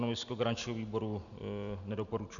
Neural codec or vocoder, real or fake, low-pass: none; real; 7.2 kHz